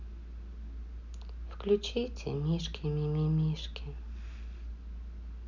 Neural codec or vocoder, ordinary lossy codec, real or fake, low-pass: none; none; real; 7.2 kHz